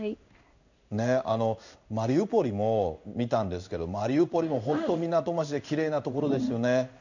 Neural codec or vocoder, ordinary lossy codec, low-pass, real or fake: codec, 16 kHz in and 24 kHz out, 1 kbps, XY-Tokenizer; none; 7.2 kHz; fake